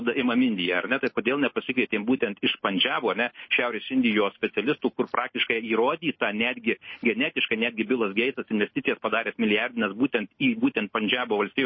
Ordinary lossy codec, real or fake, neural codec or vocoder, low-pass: MP3, 24 kbps; real; none; 7.2 kHz